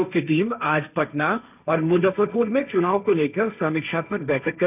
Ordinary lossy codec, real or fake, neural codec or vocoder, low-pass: none; fake; codec, 16 kHz, 1.1 kbps, Voila-Tokenizer; 3.6 kHz